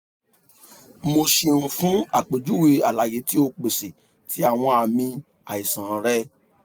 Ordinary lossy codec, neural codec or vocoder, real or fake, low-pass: none; none; real; none